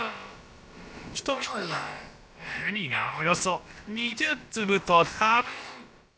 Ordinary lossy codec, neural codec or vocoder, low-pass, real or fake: none; codec, 16 kHz, about 1 kbps, DyCAST, with the encoder's durations; none; fake